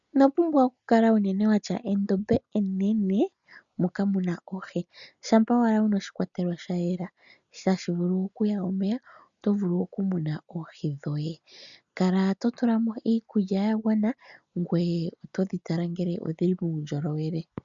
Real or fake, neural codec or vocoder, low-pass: real; none; 7.2 kHz